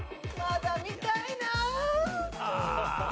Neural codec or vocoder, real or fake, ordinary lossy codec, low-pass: none; real; none; none